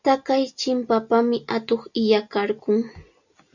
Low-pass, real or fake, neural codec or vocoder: 7.2 kHz; real; none